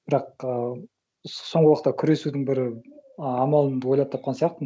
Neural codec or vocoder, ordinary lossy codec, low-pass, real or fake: none; none; none; real